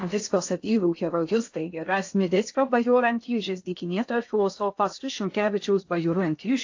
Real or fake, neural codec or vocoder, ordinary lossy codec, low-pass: fake; codec, 16 kHz in and 24 kHz out, 0.6 kbps, FocalCodec, streaming, 2048 codes; AAC, 48 kbps; 7.2 kHz